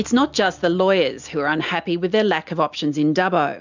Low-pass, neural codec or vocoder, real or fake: 7.2 kHz; none; real